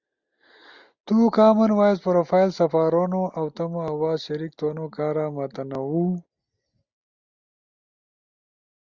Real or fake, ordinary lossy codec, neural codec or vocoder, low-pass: real; Opus, 64 kbps; none; 7.2 kHz